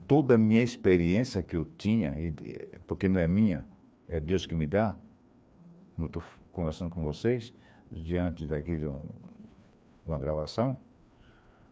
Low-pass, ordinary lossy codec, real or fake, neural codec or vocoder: none; none; fake; codec, 16 kHz, 2 kbps, FreqCodec, larger model